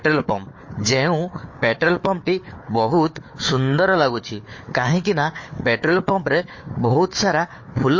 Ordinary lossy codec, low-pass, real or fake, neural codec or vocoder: MP3, 32 kbps; 7.2 kHz; fake; codec, 16 kHz, 4 kbps, FunCodec, trained on Chinese and English, 50 frames a second